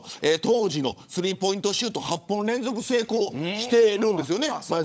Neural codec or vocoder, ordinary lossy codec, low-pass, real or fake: codec, 16 kHz, 16 kbps, FunCodec, trained on LibriTTS, 50 frames a second; none; none; fake